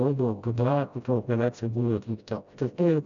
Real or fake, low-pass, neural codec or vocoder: fake; 7.2 kHz; codec, 16 kHz, 0.5 kbps, FreqCodec, smaller model